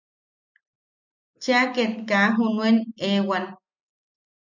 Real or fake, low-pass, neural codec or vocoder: real; 7.2 kHz; none